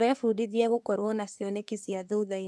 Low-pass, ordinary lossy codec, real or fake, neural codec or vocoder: none; none; fake; codec, 24 kHz, 1 kbps, SNAC